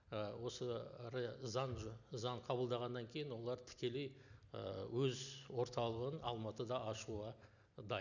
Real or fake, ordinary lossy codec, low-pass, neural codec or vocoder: real; none; 7.2 kHz; none